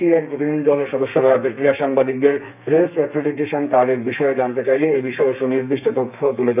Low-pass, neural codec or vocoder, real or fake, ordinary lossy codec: 3.6 kHz; codec, 32 kHz, 1.9 kbps, SNAC; fake; none